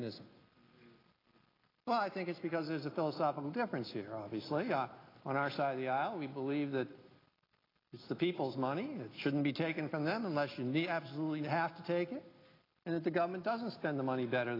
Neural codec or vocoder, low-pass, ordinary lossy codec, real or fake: none; 5.4 kHz; AAC, 24 kbps; real